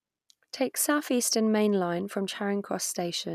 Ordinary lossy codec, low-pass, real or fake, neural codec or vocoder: none; 14.4 kHz; real; none